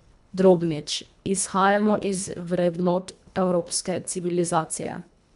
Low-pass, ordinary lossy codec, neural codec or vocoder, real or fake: 10.8 kHz; none; codec, 24 kHz, 1.5 kbps, HILCodec; fake